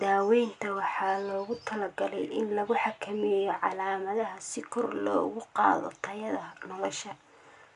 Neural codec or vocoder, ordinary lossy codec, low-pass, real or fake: none; none; 10.8 kHz; real